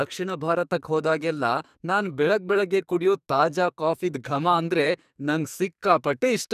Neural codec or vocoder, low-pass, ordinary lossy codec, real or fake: codec, 44.1 kHz, 2.6 kbps, SNAC; 14.4 kHz; none; fake